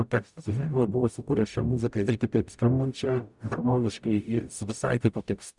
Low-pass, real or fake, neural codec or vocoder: 10.8 kHz; fake; codec, 44.1 kHz, 0.9 kbps, DAC